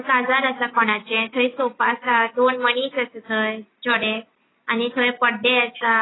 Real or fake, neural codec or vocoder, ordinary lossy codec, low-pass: real; none; AAC, 16 kbps; 7.2 kHz